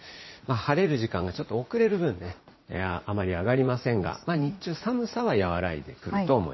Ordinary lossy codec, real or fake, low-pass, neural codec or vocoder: MP3, 24 kbps; fake; 7.2 kHz; vocoder, 44.1 kHz, 80 mel bands, Vocos